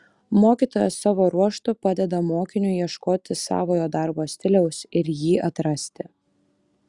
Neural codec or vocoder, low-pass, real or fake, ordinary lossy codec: none; 10.8 kHz; real; Opus, 64 kbps